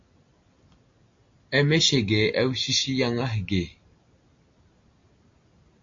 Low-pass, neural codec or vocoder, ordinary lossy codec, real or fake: 7.2 kHz; none; AAC, 48 kbps; real